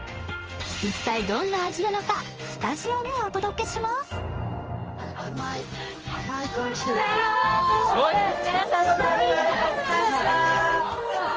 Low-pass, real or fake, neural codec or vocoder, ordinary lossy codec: 7.2 kHz; fake; codec, 16 kHz in and 24 kHz out, 1 kbps, XY-Tokenizer; Opus, 24 kbps